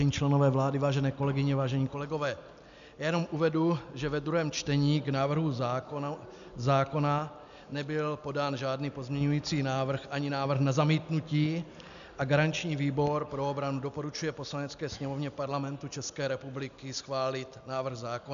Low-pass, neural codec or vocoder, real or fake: 7.2 kHz; none; real